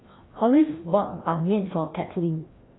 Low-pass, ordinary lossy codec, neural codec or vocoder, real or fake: 7.2 kHz; AAC, 16 kbps; codec, 16 kHz, 1 kbps, FreqCodec, larger model; fake